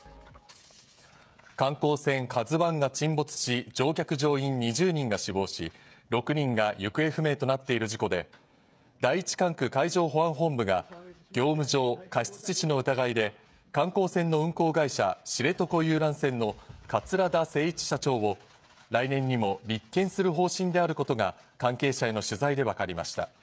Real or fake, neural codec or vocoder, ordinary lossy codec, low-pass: fake; codec, 16 kHz, 16 kbps, FreqCodec, smaller model; none; none